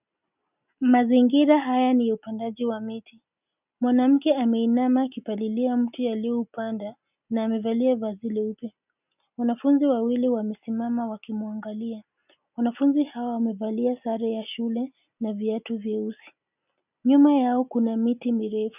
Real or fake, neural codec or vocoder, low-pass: real; none; 3.6 kHz